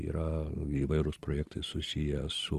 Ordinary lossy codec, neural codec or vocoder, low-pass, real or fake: Opus, 24 kbps; vocoder, 44.1 kHz, 128 mel bands every 256 samples, BigVGAN v2; 14.4 kHz; fake